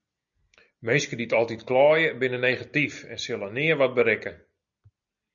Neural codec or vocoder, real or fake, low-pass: none; real; 7.2 kHz